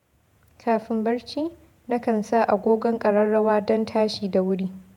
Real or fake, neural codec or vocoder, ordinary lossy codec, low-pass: fake; vocoder, 48 kHz, 128 mel bands, Vocos; MP3, 96 kbps; 19.8 kHz